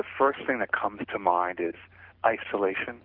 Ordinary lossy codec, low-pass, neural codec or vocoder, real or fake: Opus, 16 kbps; 5.4 kHz; none; real